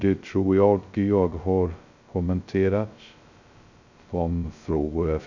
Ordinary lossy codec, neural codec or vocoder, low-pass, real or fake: none; codec, 16 kHz, 0.2 kbps, FocalCodec; 7.2 kHz; fake